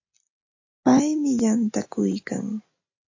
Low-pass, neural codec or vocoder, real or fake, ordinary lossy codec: 7.2 kHz; none; real; AAC, 48 kbps